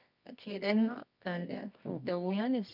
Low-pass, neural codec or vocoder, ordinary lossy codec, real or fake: 5.4 kHz; codec, 24 kHz, 0.9 kbps, WavTokenizer, medium music audio release; none; fake